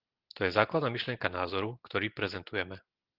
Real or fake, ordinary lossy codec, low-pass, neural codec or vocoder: real; Opus, 16 kbps; 5.4 kHz; none